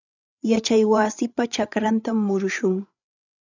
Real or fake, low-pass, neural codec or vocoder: fake; 7.2 kHz; codec, 16 kHz, 8 kbps, FreqCodec, larger model